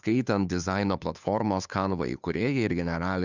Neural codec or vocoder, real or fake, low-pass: codec, 16 kHz, 2 kbps, FunCodec, trained on Chinese and English, 25 frames a second; fake; 7.2 kHz